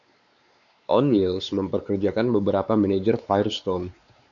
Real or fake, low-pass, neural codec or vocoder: fake; 7.2 kHz; codec, 16 kHz, 4 kbps, X-Codec, WavLM features, trained on Multilingual LibriSpeech